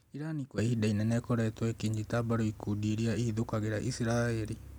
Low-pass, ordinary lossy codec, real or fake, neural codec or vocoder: none; none; real; none